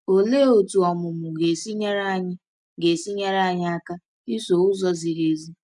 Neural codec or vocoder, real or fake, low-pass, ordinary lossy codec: none; real; 10.8 kHz; none